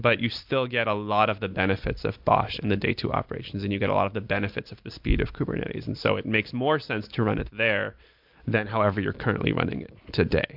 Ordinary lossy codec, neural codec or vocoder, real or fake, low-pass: MP3, 48 kbps; none; real; 5.4 kHz